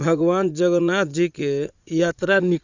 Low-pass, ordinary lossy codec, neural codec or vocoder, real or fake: 7.2 kHz; Opus, 64 kbps; vocoder, 44.1 kHz, 80 mel bands, Vocos; fake